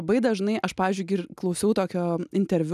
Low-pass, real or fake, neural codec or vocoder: 14.4 kHz; real; none